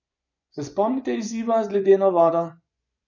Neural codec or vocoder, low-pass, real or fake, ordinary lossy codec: vocoder, 24 kHz, 100 mel bands, Vocos; 7.2 kHz; fake; none